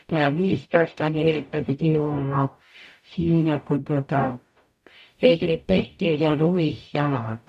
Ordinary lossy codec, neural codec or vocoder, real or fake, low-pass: none; codec, 44.1 kHz, 0.9 kbps, DAC; fake; 14.4 kHz